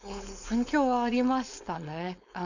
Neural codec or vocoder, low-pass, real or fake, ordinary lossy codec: codec, 16 kHz, 4.8 kbps, FACodec; 7.2 kHz; fake; none